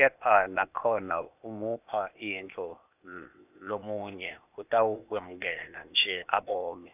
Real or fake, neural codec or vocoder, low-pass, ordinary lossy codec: fake; codec, 16 kHz, 0.8 kbps, ZipCodec; 3.6 kHz; none